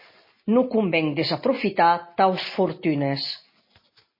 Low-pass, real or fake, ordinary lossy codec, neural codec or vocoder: 5.4 kHz; real; MP3, 24 kbps; none